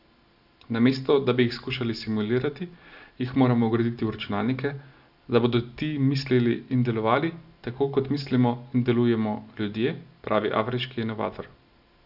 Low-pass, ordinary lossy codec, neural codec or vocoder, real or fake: 5.4 kHz; none; none; real